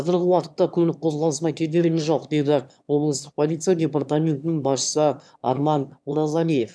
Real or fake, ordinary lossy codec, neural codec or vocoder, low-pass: fake; none; autoencoder, 22.05 kHz, a latent of 192 numbers a frame, VITS, trained on one speaker; none